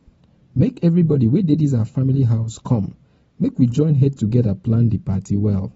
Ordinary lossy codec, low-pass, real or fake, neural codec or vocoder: AAC, 24 kbps; 19.8 kHz; fake; vocoder, 44.1 kHz, 128 mel bands every 512 samples, BigVGAN v2